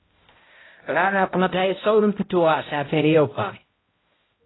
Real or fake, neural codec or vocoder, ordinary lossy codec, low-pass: fake; codec, 16 kHz, 0.5 kbps, X-Codec, HuBERT features, trained on balanced general audio; AAC, 16 kbps; 7.2 kHz